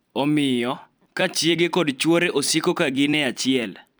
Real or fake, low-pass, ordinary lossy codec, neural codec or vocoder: fake; none; none; vocoder, 44.1 kHz, 128 mel bands every 256 samples, BigVGAN v2